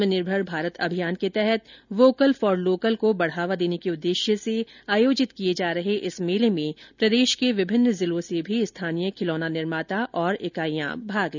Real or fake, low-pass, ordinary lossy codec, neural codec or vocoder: real; 7.2 kHz; none; none